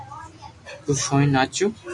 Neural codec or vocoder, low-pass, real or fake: none; 10.8 kHz; real